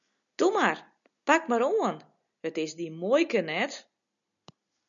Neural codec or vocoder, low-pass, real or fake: none; 7.2 kHz; real